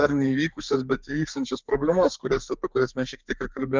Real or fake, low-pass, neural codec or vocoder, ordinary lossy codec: fake; 7.2 kHz; codec, 32 kHz, 1.9 kbps, SNAC; Opus, 24 kbps